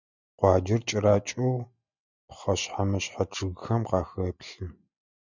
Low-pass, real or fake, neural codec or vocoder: 7.2 kHz; real; none